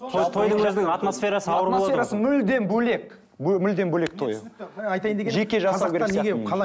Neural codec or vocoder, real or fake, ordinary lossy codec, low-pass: none; real; none; none